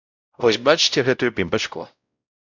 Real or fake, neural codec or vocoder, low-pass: fake; codec, 16 kHz, 0.5 kbps, X-Codec, WavLM features, trained on Multilingual LibriSpeech; 7.2 kHz